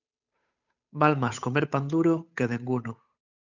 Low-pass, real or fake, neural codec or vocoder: 7.2 kHz; fake; codec, 16 kHz, 8 kbps, FunCodec, trained on Chinese and English, 25 frames a second